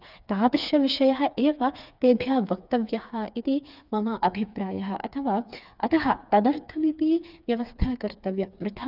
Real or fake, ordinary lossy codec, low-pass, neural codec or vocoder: fake; none; 5.4 kHz; codec, 16 kHz, 4 kbps, FreqCodec, smaller model